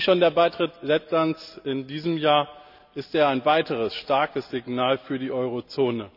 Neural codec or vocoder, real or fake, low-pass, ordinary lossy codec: none; real; 5.4 kHz; none